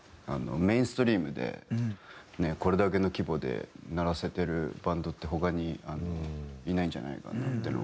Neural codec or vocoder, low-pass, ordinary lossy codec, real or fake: none; none; none; real